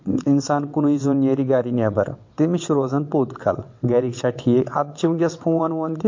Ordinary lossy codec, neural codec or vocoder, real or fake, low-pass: MP3, 48 kbps; vocoder, 22.05 kHz, 80 mel bands, WaveNeXt; fake; 7.2 kHz